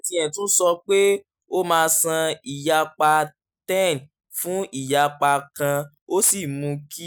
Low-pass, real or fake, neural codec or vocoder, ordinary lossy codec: none; real; none; none